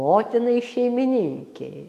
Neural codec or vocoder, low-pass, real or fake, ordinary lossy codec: autoencoder, 48 kHz, 128 numbers a frame, DAC-VAE, trained on Japanese speech; 14.4 kHz; fake; AAC, 64 kbps